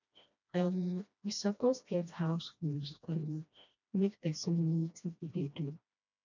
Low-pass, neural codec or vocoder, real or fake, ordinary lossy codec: 7.2 kHz; codec, 16 kHz, 1 kbps, FreqCodec, smaller model; fake; none